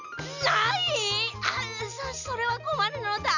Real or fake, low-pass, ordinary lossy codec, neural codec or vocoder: real; 7.2 kHz; none; none